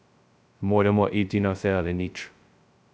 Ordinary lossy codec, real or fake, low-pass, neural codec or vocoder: none; fake; none; codec, 16 kHz, 0.2 kbps, FocalCodec